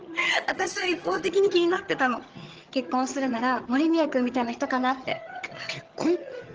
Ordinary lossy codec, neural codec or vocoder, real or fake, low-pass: Opus, 16 kbps; vocoder, 22.05 kHz, 80 mel bands, HiFi-GAN; fake; 7.2 kHz